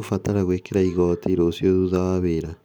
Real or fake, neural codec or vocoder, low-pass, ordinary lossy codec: real; none; none; none